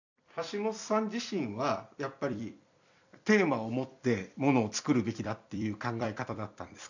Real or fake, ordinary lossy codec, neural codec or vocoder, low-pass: fake; none; vocoder, 44.1 kHz, 128 mel bands every 256 samples, BigVGAN v2; 7.2 kHz